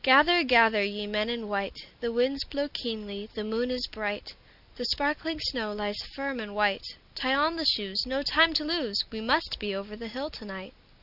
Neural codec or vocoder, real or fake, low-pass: none; real; 5.4 kHz